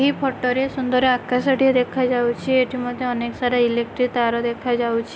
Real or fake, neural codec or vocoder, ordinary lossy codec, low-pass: real; none; none; none